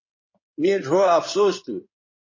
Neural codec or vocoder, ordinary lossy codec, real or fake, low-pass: codec, 16 kHz in and 24 kHz out, 2.2 kbps, FireRedTTS-2 codec; MP3, 32 kbps; fake; 7.2 kHz